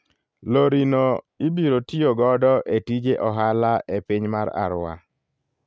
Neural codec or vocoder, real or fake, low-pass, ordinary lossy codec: none; real; none; none